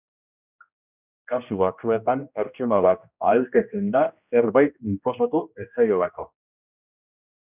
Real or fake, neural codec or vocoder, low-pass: fake; codec, 16 kHz, 1 kbps, X-Codec, HuBERT features, trained on general audio; 3.6 kHz